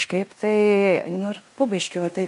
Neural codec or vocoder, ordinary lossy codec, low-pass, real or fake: codec, 16 kHz in and 24 kHz out, 0.9 kbps, LongCat-Audio-Codec, fine tuned four codebook decoder; MP3, 64 kbps; 10.8 kHz; fake